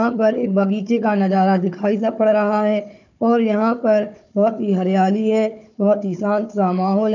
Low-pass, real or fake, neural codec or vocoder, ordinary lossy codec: 7.2 kHz; fake; codec, 16 kHz, 4 kbps, FunCodec, trained on Chinese and English, 50 frames a second; none